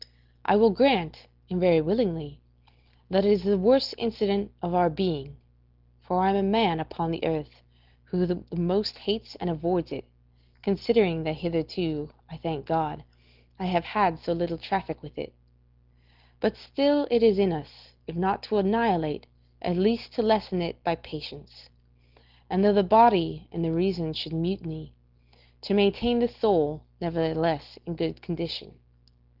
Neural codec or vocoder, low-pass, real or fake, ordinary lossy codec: none; 5.4 kHz; real; Opus, 16 kbps